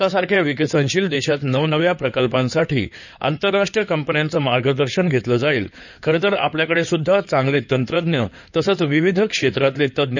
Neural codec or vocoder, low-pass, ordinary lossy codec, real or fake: codec, 16 kHz in and 24 kHz out, 2.2 kbps, FireRedTTS-2 codec; 7.2 kHz; none; fake